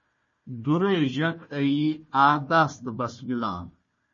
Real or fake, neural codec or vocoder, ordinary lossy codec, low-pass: fake; codec, 16 kHz, 1 kbps, FunCodec, trained on Chinese and English, 50 frames a second; MP3, 32 kbps; 7.2 kHz